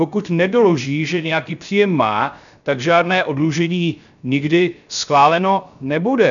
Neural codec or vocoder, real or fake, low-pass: codec, 16 kHz, 0.3 kbps, FocalCodec; fake; 7.2 kHz